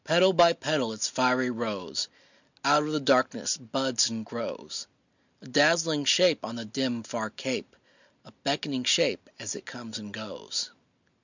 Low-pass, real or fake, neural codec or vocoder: 7.2 kHz; real; none